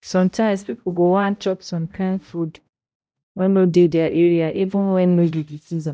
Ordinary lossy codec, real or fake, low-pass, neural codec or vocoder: none; fake; none; codec, 16 kHz, 0.5 kbps, X-Codec, HuBERT features, trained on balanced general audio